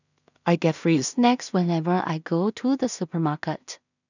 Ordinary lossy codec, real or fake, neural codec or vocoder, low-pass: none; fake; codec, 16 kHz in and 24 kHz out, 0.4 kbps, LongCat-Audio-Codec, two codebook decoder; 7.2 kHz